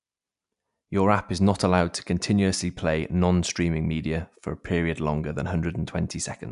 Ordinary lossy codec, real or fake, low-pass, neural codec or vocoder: none; real; 10.8 kHz; none